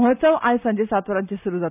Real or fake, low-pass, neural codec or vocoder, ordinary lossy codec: real; 3.6 kHz; none; none